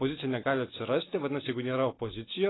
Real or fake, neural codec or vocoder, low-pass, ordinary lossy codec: real; none; 7.2 kHz; AAC, 16 kbps